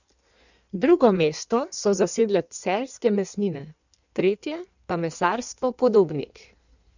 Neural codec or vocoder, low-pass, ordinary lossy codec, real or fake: codec, 16 kHz in and 24 kHz out, 1.1 kbps, FireRedTTS-2 codec; 7.2 kHz; none; fake